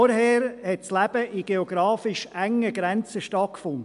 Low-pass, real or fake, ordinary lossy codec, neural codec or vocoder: 10.8 kHz; real; MP3, 64 kbps; none